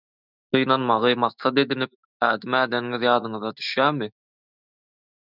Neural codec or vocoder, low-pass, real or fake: autoencoder, 48 kHz, 128 numbers a frame, DAC-VAE, trained on Japanese speech; 5.4 kHz; fake